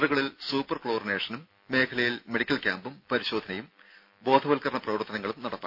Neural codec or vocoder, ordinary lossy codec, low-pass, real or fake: none; none; 5.4 kHz; real